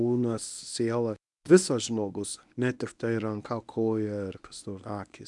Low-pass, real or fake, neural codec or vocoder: 10.8 kHz; fake; codec, 24 kHz, 0.9 kbps, WavTokenizer, medium speech release version 1